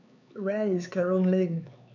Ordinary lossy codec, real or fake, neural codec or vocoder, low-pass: none; fake; codec, 16 kHz, 4 kbps, X-Codec, HuBERT features, trained on LibriSpeech; 7.2 kHz